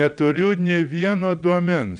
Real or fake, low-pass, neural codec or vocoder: fake; 9.9 kHz; vocoder, 22.05 kHz, 80 mel bands, Vocos